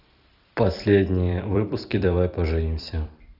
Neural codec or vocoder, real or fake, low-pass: vocoder, 44.1 kHz, 128 mel bands every 256 samples, BigVGAN v2; fake; 5.4 kHz